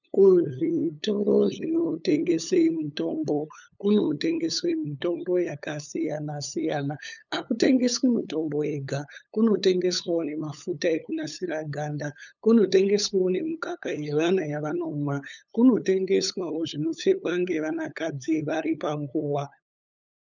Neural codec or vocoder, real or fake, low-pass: codec, 16 kHz, 8 kbps, FunCodec, trained on LibriTTS, 25 frames a second; fake; 7.2 kHz